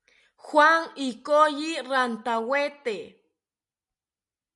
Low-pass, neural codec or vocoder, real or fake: 10.8 kHz; none; real